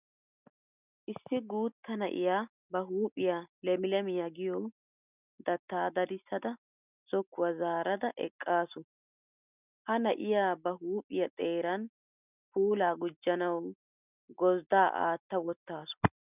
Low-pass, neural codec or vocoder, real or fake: 3.6 kHz; none; real